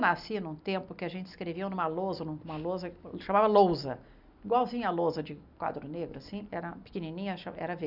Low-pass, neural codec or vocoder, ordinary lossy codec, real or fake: 5.4 kHz; none; none; real